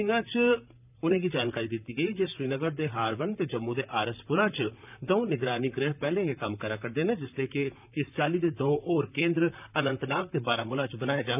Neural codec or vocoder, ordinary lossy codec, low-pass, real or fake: vocoder, 44.1 kHz, 128 mel bands, Pupu-Vocoder; none; 3.6 kHz; fake